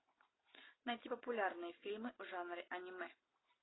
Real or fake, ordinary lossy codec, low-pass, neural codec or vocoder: real; AAC, 16 kbps; 7.2 kHz; none